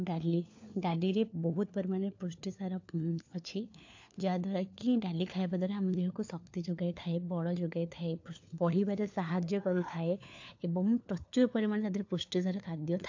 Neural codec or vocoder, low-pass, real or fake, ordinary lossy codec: codec, 16 kHz, 4 kbps, FunCodec, trained on LibriTTS, 50 frames a second; 7.2 kHz; fake; AAC, 48 kbps